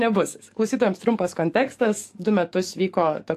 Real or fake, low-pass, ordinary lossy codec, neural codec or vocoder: fake; 14.4 kHz; AAC, 64 kbps; codec, 44.1 kHz, 7.8 kbps, DAC